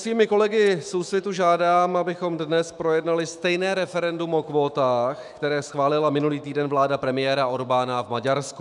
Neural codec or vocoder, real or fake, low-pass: autoencoder, 48 kHz, 128 numbers a frame, DAC-VAE, trained on Japanese speech; fake; 10.8 kHz